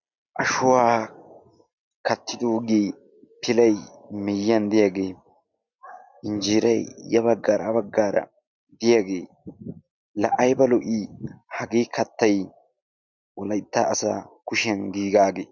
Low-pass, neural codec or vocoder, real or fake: 7.2 kHz; none; real